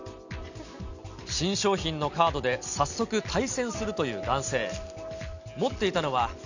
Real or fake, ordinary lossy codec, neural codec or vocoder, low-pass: real; none; none; 7.2 kHz